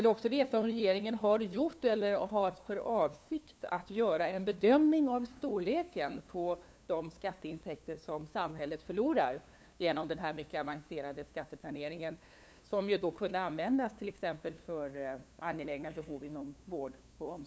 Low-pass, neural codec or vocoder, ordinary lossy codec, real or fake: none; codec, 16 kHz, 2 kbps, FunCodec, trained on LibriTTS, 25 frames a second; none; fake